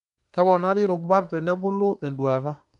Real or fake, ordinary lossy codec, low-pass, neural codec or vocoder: fake; none; 10.8 kHz; codec, 24 kHz, 1 kbps, SNAC